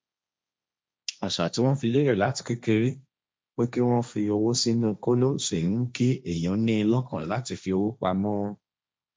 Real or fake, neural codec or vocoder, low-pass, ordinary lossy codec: fake; codec, 16 kHz, 1.1 kbps, Voila-Tokenizer; none; none